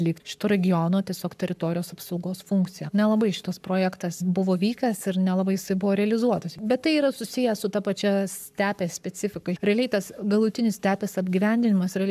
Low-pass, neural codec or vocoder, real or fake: 14.4 kHz; codec, 44.1 kHz, 7.8 kbps, Pupu-Codec; fake